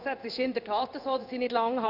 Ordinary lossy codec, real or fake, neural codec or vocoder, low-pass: none; fake; codec, 16 kHz in and 24 kHz out, 1 kbps, XY-Tokenizer; 5.4 kHz